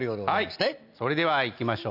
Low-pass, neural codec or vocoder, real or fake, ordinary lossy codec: 5.4 kHz; none; real; none